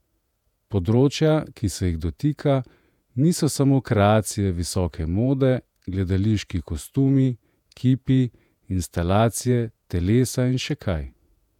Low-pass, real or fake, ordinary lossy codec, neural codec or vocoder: 19.8 kHz; fake; none; vocoder, 48 kHz, 128 mel bands, Vocos